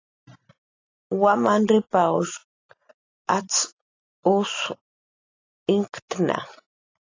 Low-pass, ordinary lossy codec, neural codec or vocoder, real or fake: 7.2 kHz; AAC, 32 kbps; none; real